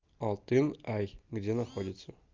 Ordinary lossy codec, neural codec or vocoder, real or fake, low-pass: Opus, 24 kbps; none; real; 7.2 kHz